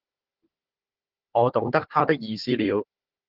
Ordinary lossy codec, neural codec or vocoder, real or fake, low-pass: Opus, 16 kbps; codec, 16 kHz, 4 kbps, FunCodec, trained on Chinese and English, 50 frames a second; fake; 5.4 kHz